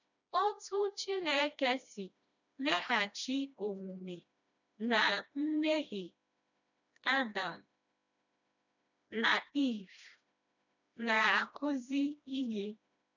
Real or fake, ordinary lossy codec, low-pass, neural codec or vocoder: fake; none; 7.2 kHz; codec, 16 kHz, 1 kbps, FreqCodec, smaller model